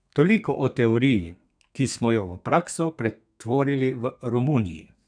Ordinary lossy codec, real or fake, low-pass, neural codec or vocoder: none; fake; 9.9 kHz; codec, 32 kHz, 1.9 kbps, SNAC